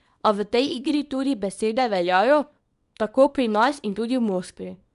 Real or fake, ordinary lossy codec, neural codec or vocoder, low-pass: fake; none; codec, 24 kHz, 0.9 kbps, WavTokenizer, small release; 10.8 kHz